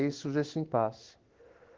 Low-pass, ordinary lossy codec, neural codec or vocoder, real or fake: 7.2 kHz; Opus, 16 kbps; codec, 16 kHz in and 24 kHz out, 1 kbps, XY-Tokenizer; fake